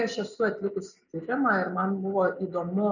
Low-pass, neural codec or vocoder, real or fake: 7.2 kHz; none; real